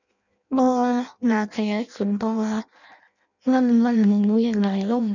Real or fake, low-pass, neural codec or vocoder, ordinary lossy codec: fake; 7.2 kHz; codec, 16 kHz in and 24 kHz out, 0.6 kbps, FireRedTTS-2 codec; none